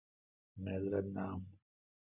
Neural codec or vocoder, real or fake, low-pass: none; real; 3.6 kHz